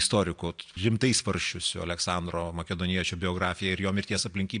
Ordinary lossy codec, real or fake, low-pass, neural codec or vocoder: AAC, 64 kbps; real; 9.9 kHz; none